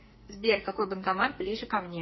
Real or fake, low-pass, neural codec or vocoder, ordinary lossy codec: fake; 7.2 kHz; codec, 44.1 kHz, 2.6 kbps, SNAC; MP3, 24 kbps